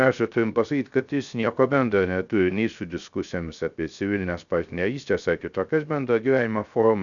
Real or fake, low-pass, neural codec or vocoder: fake; 7.2 kHz; codec, 16 kHz, 0.3 kbps, FocalCodec